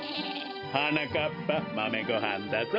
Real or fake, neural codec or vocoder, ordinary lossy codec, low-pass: real; none; none; 5.4 kHz